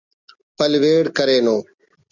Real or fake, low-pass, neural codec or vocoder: real; 7.2 kHz; none